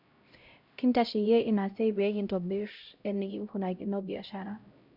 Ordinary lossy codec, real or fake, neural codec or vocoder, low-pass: none; fake; codec, 16 kHz, 0.5 kbps, X-Codec, HuBERT features, trained on LibriSpeech; 5.4 kHz